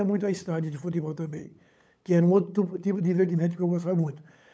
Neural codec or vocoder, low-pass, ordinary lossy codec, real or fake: codec, 16 kHz, 8 kbps, FunCodec, trained on LibriTTS, 25 frames a second; none; none; fake